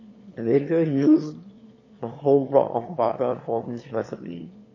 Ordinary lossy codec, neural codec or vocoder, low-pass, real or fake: MP3, 32 kbps; autoencoder, 22.05 kHz, a latent of 192 numbers a frame, VITS, trained on one speaker; 7.2 kHz; fake